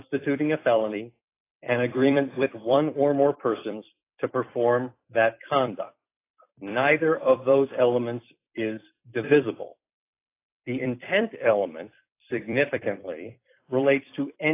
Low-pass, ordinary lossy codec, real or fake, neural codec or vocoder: 3.6 kHz; AAC, 24 kbps; fake; vocoder, 44.1 kHz, 128 mel bands every 256 samples, BigVGAN v2